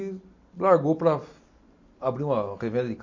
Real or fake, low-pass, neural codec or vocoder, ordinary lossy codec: real; 7.2 kHz; none; MP3, 48 kbps